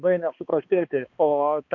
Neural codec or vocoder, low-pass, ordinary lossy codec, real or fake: codec, 16 kHz, 2 kbps, X-Codec, HuBERT features, trained on balanced general audio; 7.2 kHz; MP3, 48 kbps; fake